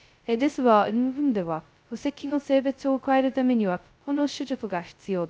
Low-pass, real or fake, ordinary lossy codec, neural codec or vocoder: none; fake; none; codec, 16 kHz, 0.2 kbps, FocalCodec